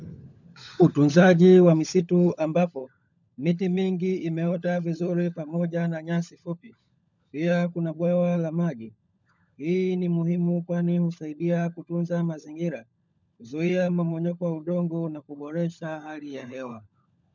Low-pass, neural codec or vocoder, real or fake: 7.2 kHz; codec, 16 kHz, 16 kbps, FunCodec, trained on LibriTTS, 50 frames a second; fake